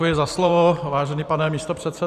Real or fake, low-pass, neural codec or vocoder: fake; 14.4 kHz; vocoder, 44.1 kHz, 128 mel bands every 512 samples, BigVGAN v2